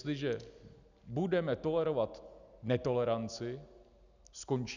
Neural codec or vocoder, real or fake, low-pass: none; real; 7.2 kHz